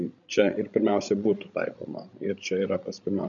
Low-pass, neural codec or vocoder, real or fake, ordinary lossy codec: 7.2 kHz; codec, 16 kHz, 16 kbps, FreqCodec, larger model; fake; MP3, 96 kbps